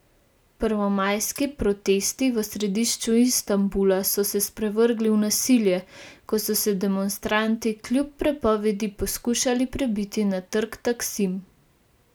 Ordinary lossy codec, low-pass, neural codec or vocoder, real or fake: none; none; none; real